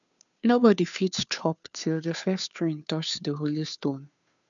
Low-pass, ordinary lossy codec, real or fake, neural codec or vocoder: 7.2 kHz; none; fake; codec, 16 kHz, 2 kbps, FunCodec, trained on Chinese and English, 25 frames a second